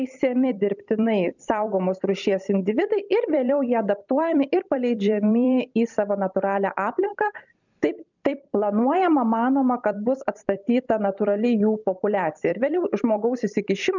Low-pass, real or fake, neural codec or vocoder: 7.2 kHz; fake; vocoder, 44.1 kHz, 128 mel bands every 256 samples, BigVGAN v2